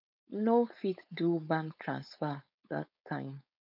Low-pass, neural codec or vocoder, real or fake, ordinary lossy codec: 5.4 kHz; codec, 16 kHz, 4.8 kbps, FACodec; fake; none